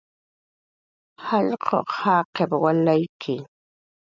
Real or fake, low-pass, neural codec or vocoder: real; 7.2 kHz; none